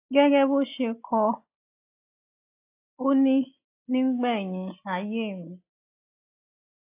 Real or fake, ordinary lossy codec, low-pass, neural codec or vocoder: real; AAC, 24 kbps; 3.6 kHz; none